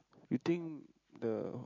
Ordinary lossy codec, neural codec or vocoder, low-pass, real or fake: MP3, 48 kbps; none; 7.2 kHz; real